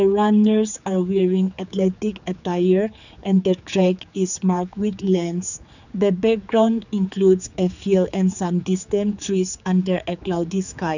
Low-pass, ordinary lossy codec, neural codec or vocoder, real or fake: 7.2 kHz; none; codec, 16 kHz, 4 kbps, X-Codec, HuBERT features, trained on general audio; fake